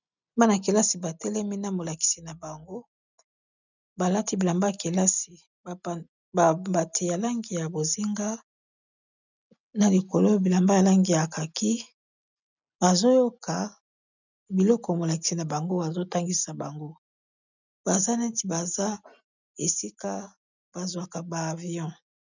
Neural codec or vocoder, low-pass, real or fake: none; 7.2 kHz; real